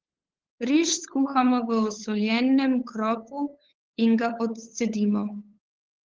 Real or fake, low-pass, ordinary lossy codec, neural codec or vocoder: fake; 7.2 kHz; Opus, 16 kbps; codec, 16 kHz, 8 kbps, FunCodec, trained on LibriTTS, 25 frames a second